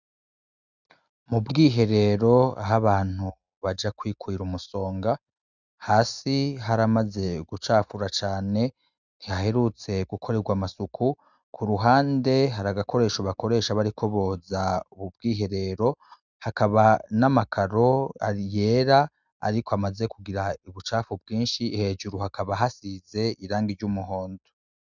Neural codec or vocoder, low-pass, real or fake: none; 7.2 kHz; real